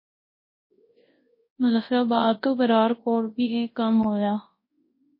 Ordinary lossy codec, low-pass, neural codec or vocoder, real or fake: MP3, 24 kbps; 5.4 kHz; codec, 24 kHz, 0.9 kbps, WavTokenizer, large speech release; fake